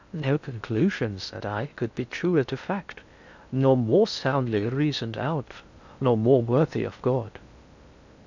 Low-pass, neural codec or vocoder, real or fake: 7.2 kHz; codec, 16 kHz in and 24 kHz out, 0.6 kbps, FocalCodec, streaming, 2048 codes; fake